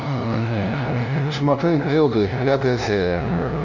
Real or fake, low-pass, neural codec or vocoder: fake; 7.2 kHz; codec, 16 kHz, 0.5 kbps, FunCodec, trained on LibriTTS, 25 frames a second